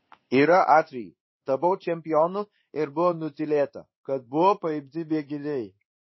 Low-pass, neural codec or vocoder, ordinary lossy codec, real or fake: 7.2 kHz; codec, 16 kHz in and 24 kHz out, 1 kbps, XY-Tokenizer; MP3, 24 kbps; fake